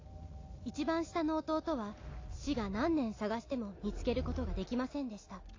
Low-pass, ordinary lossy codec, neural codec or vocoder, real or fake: 7.2 kHz; AAC, 32 kbps; none; real